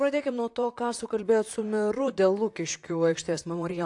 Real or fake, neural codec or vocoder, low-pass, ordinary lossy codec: fake; vocoder, 44.1 kHz, 128 mel bands, Pupu-Vocoder; 10.8 kHz; AAC, 64 kbps